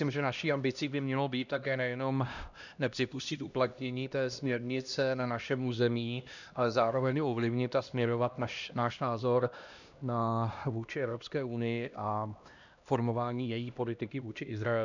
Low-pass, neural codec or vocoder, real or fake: 7.2 kHz; codec, 16 kHz, 1 kbps, X-Codec, HuBERT features, trained on LibriSpeech; fake